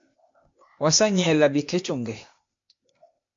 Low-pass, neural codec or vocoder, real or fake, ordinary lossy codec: 7.2 kHz; codec, 16 kHz, 0.8 kbps, ZipCodec; fake; MP3, 48 kbps